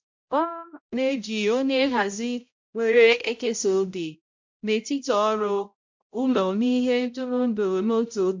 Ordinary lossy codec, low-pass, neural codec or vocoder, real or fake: MP3, 64 kbps; 7.2 kHz; codec, 16 kHz, 0.5 kbps, X-Codec, HuBERT features, trained on balanced general audio; fake